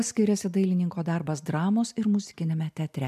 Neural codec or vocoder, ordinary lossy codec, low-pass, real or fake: none; MP3, 96 kbps; 14.4 kHz; real